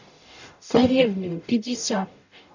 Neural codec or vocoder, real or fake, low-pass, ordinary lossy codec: codec, 44.1 kHz, 0.9 kbps, DAC; fake; 7.2 kHz; none